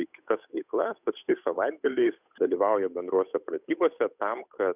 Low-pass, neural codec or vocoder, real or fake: 3.6 kHz; codec, 16 kHz, 8 kbps, FunCodec, trained on Chinese and English, 25 frames a second; fake